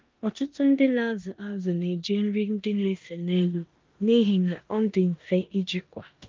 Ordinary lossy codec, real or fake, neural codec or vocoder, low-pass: Opus, 24 kbps; fake; codec, 16 kHz in and 24 kHz out, 0.9 kbps, LongCat-Audio-Codec, four codebook decoder; 7.2 kHz